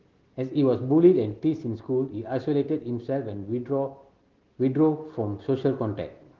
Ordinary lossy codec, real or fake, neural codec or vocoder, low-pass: Opus, 16 kbps; real; none; 7.2 kHz